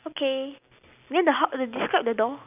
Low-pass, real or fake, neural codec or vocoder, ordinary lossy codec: 3.6 kHz; real; none; none